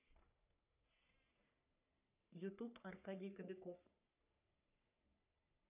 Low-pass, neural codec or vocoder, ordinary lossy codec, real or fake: 3.6 kHz; codec, 44.1 kHz, 3.4 kbps, Pupu-Codec; none; fake